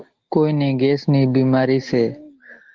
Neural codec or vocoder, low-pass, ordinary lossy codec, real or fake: none; 7.2 kHz; Opus, 16 kbps; real